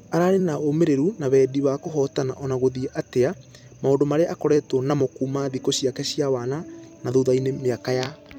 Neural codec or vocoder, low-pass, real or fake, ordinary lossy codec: vocoder, 44.1 kHz, 128 mel bands every 256 samples, BigVGAN v2; 19.8 kHz; fake; none